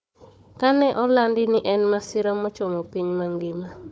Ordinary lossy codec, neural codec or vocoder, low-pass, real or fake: none; codec, 16 kHz, 4 kbps, FunCodec, trained on Chinese and English, 50 frames a second; none; fake